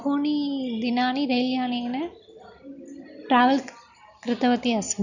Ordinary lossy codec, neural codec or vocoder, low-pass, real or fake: none; none; 7.2 kHz; real